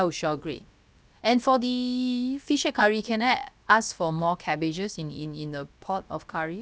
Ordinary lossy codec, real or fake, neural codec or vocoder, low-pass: none; fake; codec, 16 kHz, about 1 kbps, DyCAST, with the encoder's durations; none